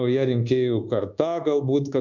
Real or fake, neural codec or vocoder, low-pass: fake; codec, 24 kHz, 1.2 kbps, DualCodec; 7.2 kHz